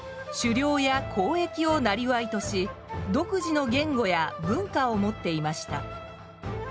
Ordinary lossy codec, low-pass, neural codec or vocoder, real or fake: none; none; none; real